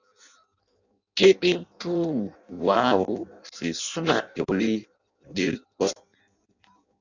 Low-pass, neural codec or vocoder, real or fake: 7.2 kHz; codec, 16 kHz in and 24 kHz out, 0.6 kbps, FireRedTTS-2 codec; fake